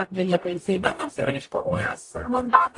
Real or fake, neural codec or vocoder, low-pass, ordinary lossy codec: fake; codec, 44.1 kHz, 0.9 kbps, DAC; 10.8 kHz; AAC, 48 kbps